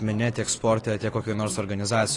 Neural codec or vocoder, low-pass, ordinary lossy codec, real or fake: none; 10.8 kHz; AAC, 32 kbps; real